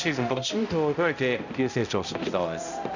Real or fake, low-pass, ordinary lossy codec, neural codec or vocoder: fake; 7.2 kHz; none; codec, 16 kHz, 0.5 kbps, X-Codec, HuBERT features, trained on balanced general audio